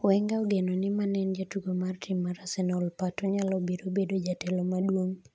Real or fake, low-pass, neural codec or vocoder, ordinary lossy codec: real; none; none; none